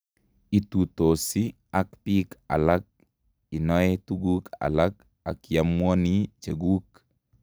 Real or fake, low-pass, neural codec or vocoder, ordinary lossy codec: real; none; none; none